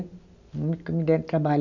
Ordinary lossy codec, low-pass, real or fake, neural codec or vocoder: none; 7.2 kHz; real; none